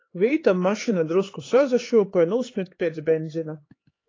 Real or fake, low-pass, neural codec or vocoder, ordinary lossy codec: fake; 7.2 kHz; codec, 16 kHz, 4 kbps, X-Codec, HuBERT features, trained on LibriSpeech; AAC, 32 kbps